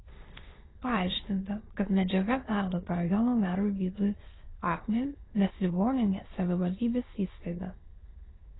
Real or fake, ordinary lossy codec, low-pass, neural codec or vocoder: fake; AAC, 16 kbps; 7.2 kHz; autoencoder, 22.05 kHz, a latent of 192 numbers a frame, VITS, trained on many speakers